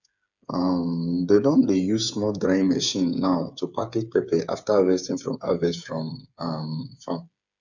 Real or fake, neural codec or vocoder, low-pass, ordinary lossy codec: fake; codec, 16 kHz, 8 kbps, FreqCodec, smaller model; 7.2 kHz; none